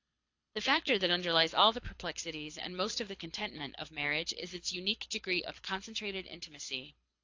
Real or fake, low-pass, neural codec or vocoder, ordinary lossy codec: fake; 7.2 kHz; codec, 24 kHz, 6 kbps, HILCodec; AAC, 48 kbps